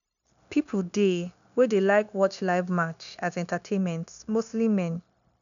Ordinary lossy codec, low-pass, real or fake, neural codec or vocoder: none; 7.2 kHz; fake; codec, 16 kHz, 0.9 kbps, LongCat-Audio-Codec